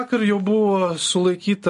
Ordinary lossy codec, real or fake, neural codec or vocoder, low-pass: MP3, 48 kbps; real; none; 14.4 kHz